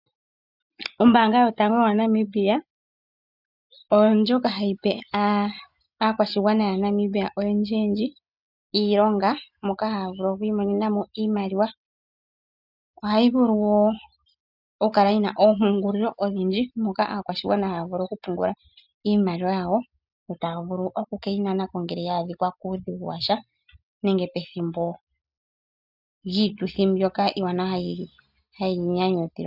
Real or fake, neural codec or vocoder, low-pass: real; none; 5.4 kHz